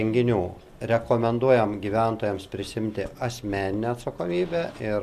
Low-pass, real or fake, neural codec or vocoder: 14.4 kHz; real; none